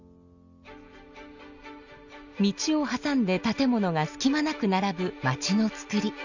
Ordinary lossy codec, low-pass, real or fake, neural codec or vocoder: none; 7.2 kHz; real; none